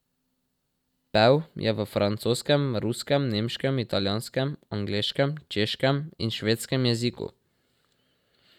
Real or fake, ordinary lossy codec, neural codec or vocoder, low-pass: real; none; none; 19.8 kHz